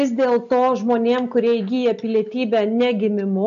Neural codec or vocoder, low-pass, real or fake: none; 7.2 kHz; real